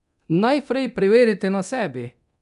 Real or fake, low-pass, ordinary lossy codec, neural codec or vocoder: fake; 10.8 kHz; none; codec, 24 kHz, 0.9 kbps, DualCodec